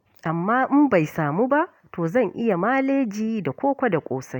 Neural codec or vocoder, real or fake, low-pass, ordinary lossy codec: none; real; 19.8 kHz; none